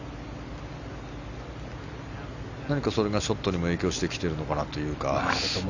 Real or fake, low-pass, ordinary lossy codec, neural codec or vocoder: real; 7.2 kHz; none; none